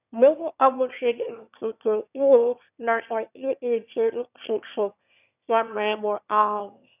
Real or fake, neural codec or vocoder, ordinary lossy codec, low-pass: fake; autoencoder, 22.05 kHz, a latent of 192 numbers a frame, VITS, trained on one speaker; none; 3.6 kHz